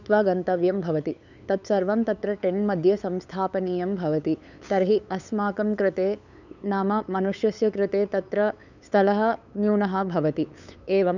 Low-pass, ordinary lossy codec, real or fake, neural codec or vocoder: 7.2 kHz; none; fake; codec, 16 kHz, 8 kbps, FunCodec, trained on LibriTTS, 25 frames a second